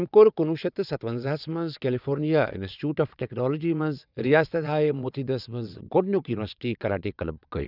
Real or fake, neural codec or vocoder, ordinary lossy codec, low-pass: fake; vocoder, 22.05 kHz, 80 mel bands, WaveNeXt; none; 5.4 kHz